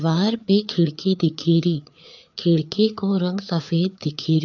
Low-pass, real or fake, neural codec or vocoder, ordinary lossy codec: 7.2 kHz; fake; codec, 16 kHz, 4 kbps, FreqCodec, larger model; none